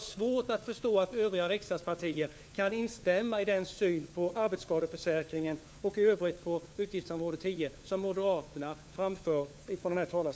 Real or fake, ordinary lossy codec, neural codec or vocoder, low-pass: fake; none; codec, 16 kHz, 4 kbps, FunCodec, trained on LibriTTS, 50 frames a second; none